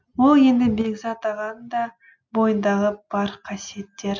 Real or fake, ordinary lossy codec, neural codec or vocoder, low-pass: real; none; none; none